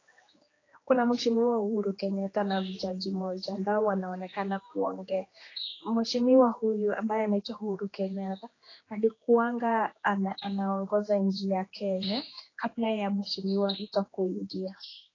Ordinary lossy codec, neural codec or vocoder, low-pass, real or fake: AAC, 32 kbps; codec, 16 kHz, 2 kbps, X-Codec, HuBERT features, trained on general audio; 7.2 kHz; fake